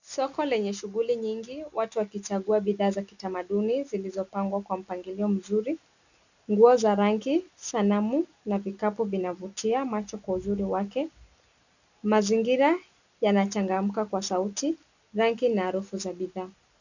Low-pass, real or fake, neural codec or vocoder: 7.2 kHz; real; none